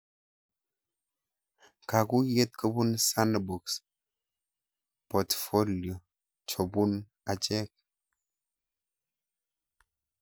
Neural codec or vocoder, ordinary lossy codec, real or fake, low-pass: none; none; real; none